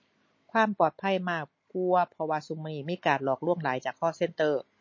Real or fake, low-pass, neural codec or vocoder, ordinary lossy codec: real; 7.2 kHz; none; MP3, 32 kbps